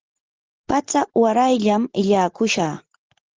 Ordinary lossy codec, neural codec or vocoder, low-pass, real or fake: Opus, 16 kbps; none; 7.2 kHz; real